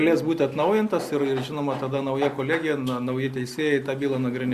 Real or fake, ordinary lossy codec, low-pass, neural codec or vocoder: real; Opus, 64 kbps; 14.4 kHz; none